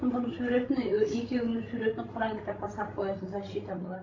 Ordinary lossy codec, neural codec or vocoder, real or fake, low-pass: AAC, 32 kbps; codec, 16 kHz, 16 kbps, FreqCodec, larger model; fake; 7.2 kHz